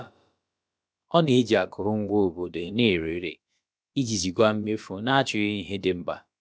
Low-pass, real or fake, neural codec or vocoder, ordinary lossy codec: none; fake; codec, 16 kHz, about 1 kbps, DyCAST, with the encoder's durations; none